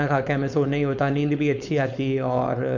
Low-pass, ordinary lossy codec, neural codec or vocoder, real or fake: 7.2 kHz; none; codec, 16 kHz, 4.8 kbps, FACodec; fake